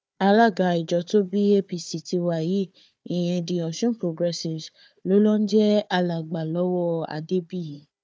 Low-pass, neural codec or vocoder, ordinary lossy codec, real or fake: none; codec, 16 kHz, 4 kbps, FunCodec, trained on Chinese and English, 50 frames a second; none; fake